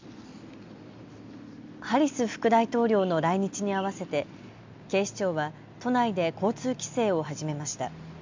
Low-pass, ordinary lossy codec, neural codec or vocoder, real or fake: 7.2 kHz; MP3, 64 kbps; none; real